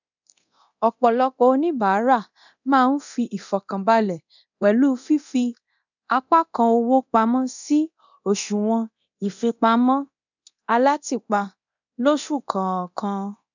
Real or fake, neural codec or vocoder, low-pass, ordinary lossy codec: fake; codec, 24 kHz, 0.9 kbps, DualCodec; 7.2 kHz; none